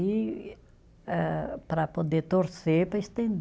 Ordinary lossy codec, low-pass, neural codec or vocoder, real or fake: none; none; none; real